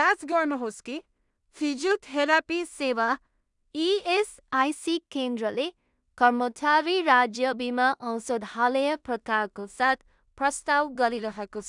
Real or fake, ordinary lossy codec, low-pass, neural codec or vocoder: fake; none; 10.8 kHz; codec, 16 kHz in and 24 kHz out, 0.4 kbps, LongCat-Audio-Codec, two codebook decoder